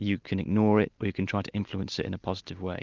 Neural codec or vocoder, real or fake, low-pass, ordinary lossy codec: none; real; 7.2 kHz; Opus, 32 kbps